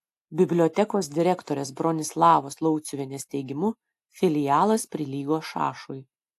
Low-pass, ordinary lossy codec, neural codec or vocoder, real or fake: 14.4 kHz; AAC, 64 kbps; none; real